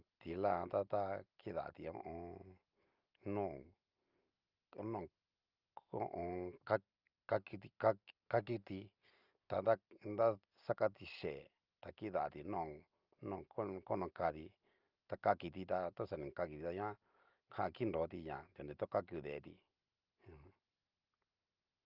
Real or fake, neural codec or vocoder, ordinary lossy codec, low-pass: real; none; Opus, 32 kbps; 5.4 kHz